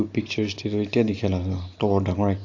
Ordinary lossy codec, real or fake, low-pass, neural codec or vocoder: AAC, 48 kbps; real; 7.2 kHz; none